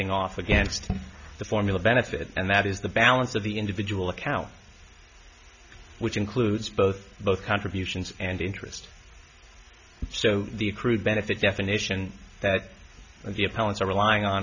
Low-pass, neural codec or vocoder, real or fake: 7.2 kHz; none; real